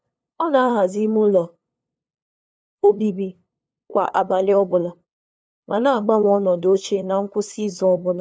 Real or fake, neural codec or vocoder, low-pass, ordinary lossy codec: fake; codec, 16 kHz, 2 kbps, FunCodec, trained on LibriTTS, 25 frames a second; none; none